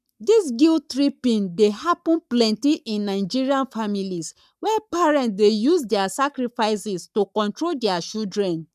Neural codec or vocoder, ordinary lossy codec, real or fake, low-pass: codec, 44.1 kHz, 7.8 kbps, Pupu-Codec; none; fake; 14.4 kHz